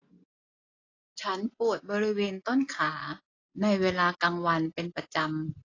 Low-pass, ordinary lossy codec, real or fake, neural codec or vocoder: 7.2 kHz; AAC, 32 kbps; real; none